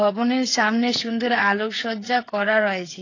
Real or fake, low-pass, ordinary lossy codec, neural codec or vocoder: fake; 7.2 kHz; AAC, 32 kbps; codec, 16 kHz, 8 kbps, FreqCodec, smaller model